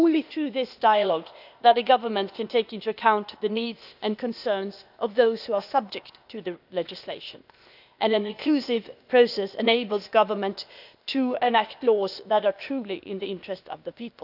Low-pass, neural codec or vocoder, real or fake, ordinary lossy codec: 5.4 kHz; codec, 16 kHz, 0.8 kbps, ZipCodec; fake; none